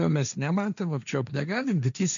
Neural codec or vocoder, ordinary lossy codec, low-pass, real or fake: codec, 16 kHz, 1.1 kbps, Voila-Tokenizer; MP3, 96 kbps; 7.2 kHz; fake